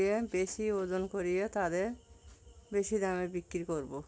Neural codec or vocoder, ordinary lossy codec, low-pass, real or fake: none; none; none; real